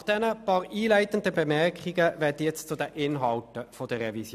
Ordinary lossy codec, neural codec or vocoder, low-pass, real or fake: none; none; 14.4 kHz; real